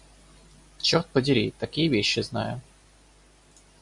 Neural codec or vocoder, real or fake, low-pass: none; real; 10.8 kHz